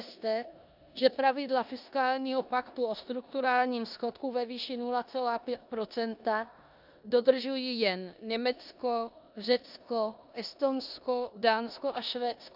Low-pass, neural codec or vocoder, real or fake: 5.4 kHz; codec, 16 kHz in and 24 kHz out, 0.9 kbps, LongCat-Audio-Codec, four codebook decoder; fake